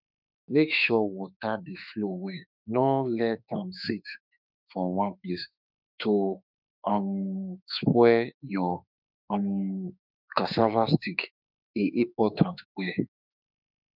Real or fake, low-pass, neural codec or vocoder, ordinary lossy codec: fake; 5.4 kHz; autoencoder, 48 kHz, 32 numbers a frame, DAC-VAE, trained on Japanese speech; none